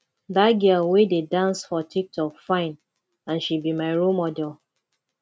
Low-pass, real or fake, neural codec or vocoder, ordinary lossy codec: none; real; none; none